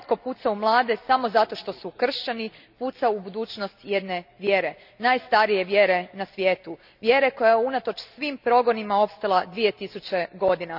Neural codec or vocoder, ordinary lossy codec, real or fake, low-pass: none; none; real; 5.4 kHz